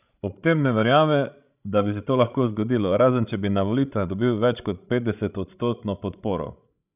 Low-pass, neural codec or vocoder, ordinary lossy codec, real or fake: 3.6 kHz; codec, 16 kHz, 16 kbps, FreqCodec, larger model; none; fake